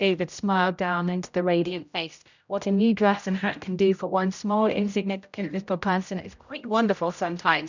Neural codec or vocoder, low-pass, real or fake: codec, 16 kHz, 0.5 kbps, X-Codec, HuBERT features, trained on general audio; 7.2 kHz; fake